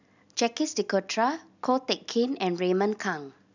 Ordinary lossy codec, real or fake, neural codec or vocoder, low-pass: none; real; none; 7.2 kHz